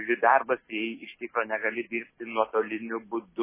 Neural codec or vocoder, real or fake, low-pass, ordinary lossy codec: none; real; 3.6 kHz; MP3, 16 kbps